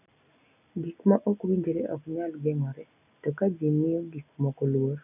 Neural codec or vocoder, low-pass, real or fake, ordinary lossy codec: none; 3.6 kHz; real; none